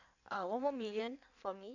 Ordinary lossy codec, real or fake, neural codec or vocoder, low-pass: none; fake; codec, 16 kHz in and 24 kHz out, 1.1 kbps, FireRedTTS-2 codec; 7.2 kHz